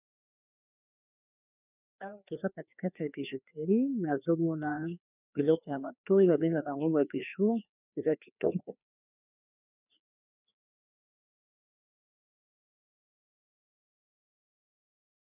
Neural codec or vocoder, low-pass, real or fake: codec, 16 kHz, 2 kbps, FreqCodec, larger model; 3.6 kHz; fake